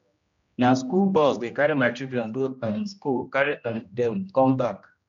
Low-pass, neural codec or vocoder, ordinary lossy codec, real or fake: 7.2 kHz; codec, 16 kHz, 1 kbps, X-Codec, HuBERT features, trained on general audio; MP3, 64 kbps; fake